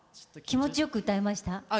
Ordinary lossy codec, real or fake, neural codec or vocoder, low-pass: none; real; none; none